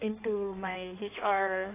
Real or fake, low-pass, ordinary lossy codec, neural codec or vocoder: fake; 3.6 kHz; AAC, 24 kbps; codec, 16 kHz in and 24 kHz out, 1.1 kbps, FireRedTTS-2 codec